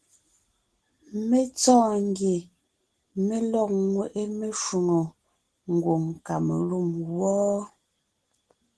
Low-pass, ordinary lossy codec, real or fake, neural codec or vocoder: 10.8 kHz; Opus, 16 kbps; real; none